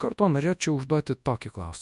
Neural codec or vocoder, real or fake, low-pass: codec, 24 kHz, 0.9 kbps, WavTokenizer, large speech release; fake; 10.8 kHz